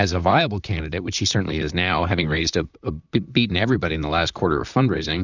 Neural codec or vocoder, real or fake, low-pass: vocoder, 44.1 kHz, 128 mel bands, Pupu-Vocoder; fake; 7.2 kHz